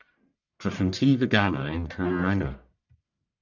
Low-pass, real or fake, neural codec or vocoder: 7.2 kHz; fake; codec, 44.1 kHz, 1.7 kbps, Pupu-Codec